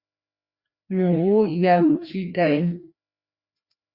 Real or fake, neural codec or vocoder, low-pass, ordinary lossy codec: fake; codec, 16 kHz, 1 kbps, FreqCodec, larger model; 5.4 kHz; Opus, 64 kbps